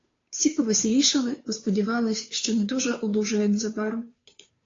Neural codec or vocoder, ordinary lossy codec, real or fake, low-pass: codec, 16 kHz, 2 kbps, FunCodec, trained on Chinese and English, 25 frames a second; AAC, 32 kbps; fake; 7.2 kHz